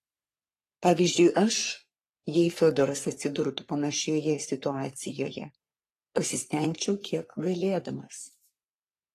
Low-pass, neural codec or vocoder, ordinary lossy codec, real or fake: 14.4 kHz; codec, 44.1 kHz, 3.4 kbps, Pupu-Codec; AAC, 48 kbps; fake